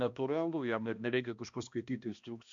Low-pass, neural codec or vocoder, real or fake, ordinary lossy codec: 7.2 kHz; codec, 16 kHz, 1 kbps, X-Codec, HuBERT features, trained on balanced general audio; fake; MP3, 64 kbps